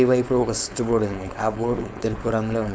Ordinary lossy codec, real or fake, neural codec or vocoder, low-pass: none; fake; codec, 16 kHz, 4.8 kbps, FACodec; none